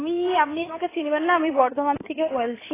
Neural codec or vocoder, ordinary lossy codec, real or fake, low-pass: codec, 16 kHz in and 24 kHz out, 2.2 kbps, FireRedTTS-2 codec; AAC, 16 kbps; fake; 3.6 kHz